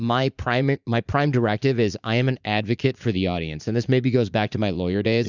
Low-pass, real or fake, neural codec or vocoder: 7.2 kHz; fake; vocoder, 44.1 kHz, 80 mel bands, Vocos